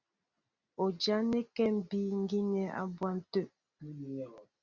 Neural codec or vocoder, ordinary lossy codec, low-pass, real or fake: none; Opus, 64 kbps; 7.2 kHz; real